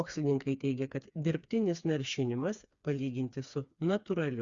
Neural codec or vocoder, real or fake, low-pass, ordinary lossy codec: codec, 16 kHz, 4 kbps, FreqCodec, smaller model; fake; 7.2 kHz; Opus, 64 kbps